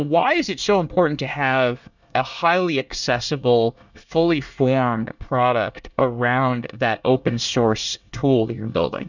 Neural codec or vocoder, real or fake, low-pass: codec, 24 kHz, 1 kbps, SNAC; fake; 7.2 kHz